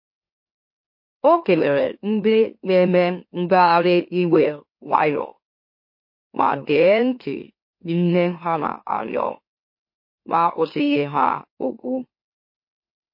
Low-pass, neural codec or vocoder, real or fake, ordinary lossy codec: 5.4 kHz; autoencoder, 44.1 kHz, a latent of 192 numbers a frame, MeloTTS; fake; MP3, 32 kbps